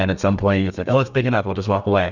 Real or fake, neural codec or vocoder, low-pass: fake; codec, 32 kHz, 1.9 kbps, SNAC; 7.2 kHz